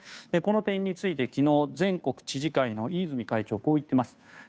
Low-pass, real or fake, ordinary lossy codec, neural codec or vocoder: none; fake; none; codec, 16 kHz, 2 kbps, FunCodec, trained on Chinese and English, 25 frames a second